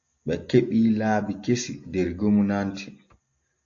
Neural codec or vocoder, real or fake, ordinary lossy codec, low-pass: none; real; AAC, 48 kbps; 7.2 kHz